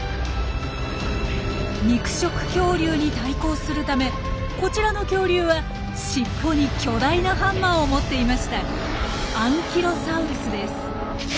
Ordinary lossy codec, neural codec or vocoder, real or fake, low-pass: none; none; real; none